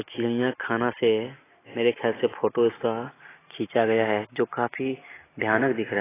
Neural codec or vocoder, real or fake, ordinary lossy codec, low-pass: none; real; AAC, 16 kbps; 3.6 kHz